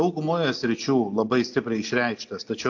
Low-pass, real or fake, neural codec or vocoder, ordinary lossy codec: 7.2 kHz; real; none; AAC, 48 kbps